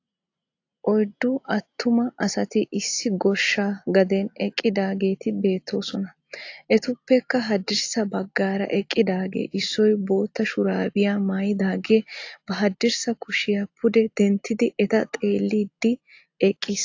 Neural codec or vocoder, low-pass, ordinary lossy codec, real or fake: none; 7.2 kHz; AAC, 48 kbps; real